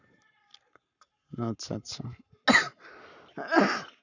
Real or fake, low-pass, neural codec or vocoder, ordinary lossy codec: fake; 7.2 kHz; codec, 44.1 kHz, 7.8 kbps, Pupu-Codec; none